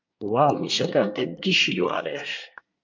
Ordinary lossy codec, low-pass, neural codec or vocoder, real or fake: MP3, 48 kbps; 7.2 kHz; codec, 24 kHz, 1 kbps, SNAC; fake